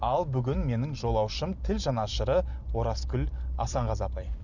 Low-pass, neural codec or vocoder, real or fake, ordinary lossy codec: 7.2 kHz; none; real; none